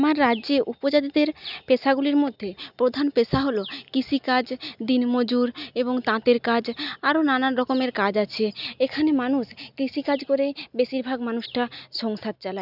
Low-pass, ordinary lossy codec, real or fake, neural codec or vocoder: 5.4 kHz; none; real; none